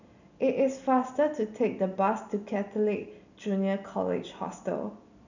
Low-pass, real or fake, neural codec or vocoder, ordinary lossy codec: 7.2 kHz; real; none; none